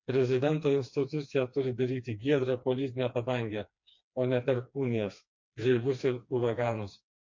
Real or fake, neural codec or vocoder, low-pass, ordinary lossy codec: fake; codec, 16 kHz, 2 kbps, FreqCodec, smaller model; 7.2 kHz; MP3, 48 kbps